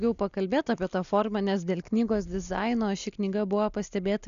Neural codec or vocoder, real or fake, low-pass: none; real; 7.2 kHz